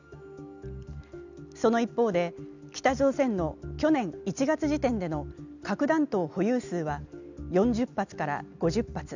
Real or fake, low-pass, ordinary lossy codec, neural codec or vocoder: real; 7.2 kHz; none; none